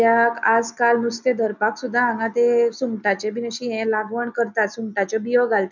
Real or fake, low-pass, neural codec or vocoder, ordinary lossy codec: real; 7.2 kHz; none; none